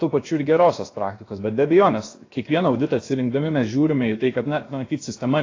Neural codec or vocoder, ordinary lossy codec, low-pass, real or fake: codec, 16 kHz, about 1 kbps, DyCAST, with the encoder's durations; AAC, 32 kbps; 7.2 kHz; fake